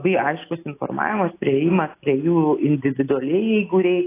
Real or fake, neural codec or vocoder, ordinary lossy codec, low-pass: real; none; AAC, 24 kbps; 3.6 kHz